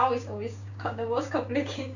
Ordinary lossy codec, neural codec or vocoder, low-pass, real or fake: none; codec, 16 kHz, 6 kbps, DAC; 7.2 kHz; fake